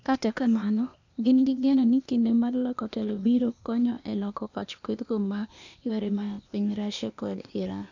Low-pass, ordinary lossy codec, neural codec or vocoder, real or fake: 7.2 kHz; none; codec, 16 kHz, 0.8 kbps, ZipCodec; fake